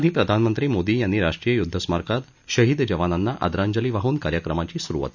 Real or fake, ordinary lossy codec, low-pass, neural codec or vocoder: real; none; 7.2 kHz; none